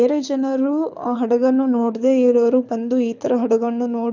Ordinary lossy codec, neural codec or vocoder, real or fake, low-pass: none; codec, 24 kHz, 6 kbps, HILCodec; fake; 7.2 kHz